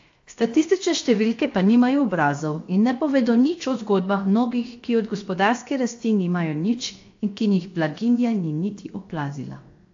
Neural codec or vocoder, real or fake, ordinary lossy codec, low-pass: codec, 16 kHz, 0.7 kbps, FocalCodec; fake; AAC, 48 kbps; 7.2 kHz